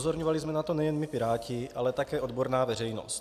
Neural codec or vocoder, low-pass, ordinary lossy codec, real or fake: none; 14.4 kHz; AAC, 96 kbps; real